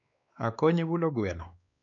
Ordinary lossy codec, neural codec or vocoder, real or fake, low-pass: none; codec, 16 kHz, 2 kbps, X-Codec, WavLM features, trained on Multilingual LibriSpeech; fake; 7.2 kHz